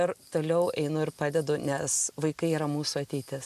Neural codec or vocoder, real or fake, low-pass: none; real; 14.4 kHz